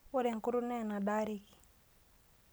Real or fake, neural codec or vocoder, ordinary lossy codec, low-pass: real; none; none; none